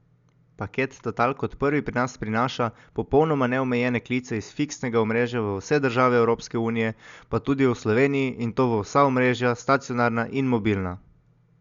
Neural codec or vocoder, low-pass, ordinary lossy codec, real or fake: none; 7.2 kHz; Opus, 64 kbps; real